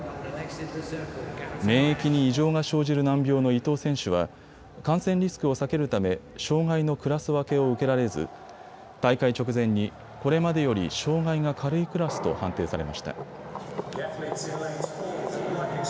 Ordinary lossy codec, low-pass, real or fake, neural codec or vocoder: none; none; real; none